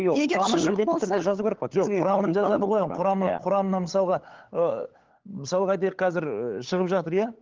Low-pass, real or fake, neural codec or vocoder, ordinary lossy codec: 7.2 kHz; fake; codec, 16 kHz, 8 kbps, FunCodec, trained on LibriTTS, 25 frames a second; Opus, 16 kbps